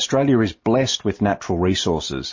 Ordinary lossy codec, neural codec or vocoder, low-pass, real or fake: MP3, 32 kbps; none; 7.2 kHz; real